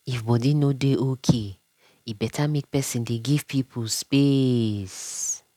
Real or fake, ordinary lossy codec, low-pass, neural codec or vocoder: real; none; 19.8 kHz; none